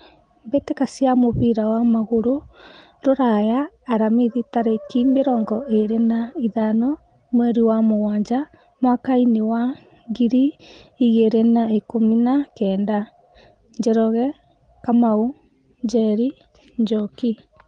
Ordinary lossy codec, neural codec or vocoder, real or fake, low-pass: Opus, 24 kbps; none; real; 9.9 kHz